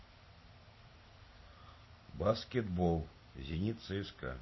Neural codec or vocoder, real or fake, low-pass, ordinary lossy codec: none; real; 7.2 kHz; MP3, 24 kbps